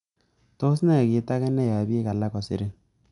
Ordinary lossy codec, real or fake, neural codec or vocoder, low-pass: none; real; none; 10.8 kHz